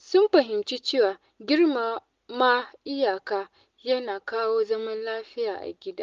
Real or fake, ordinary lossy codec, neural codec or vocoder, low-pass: real; Opus, 32 kbps; none; 7.2 kHz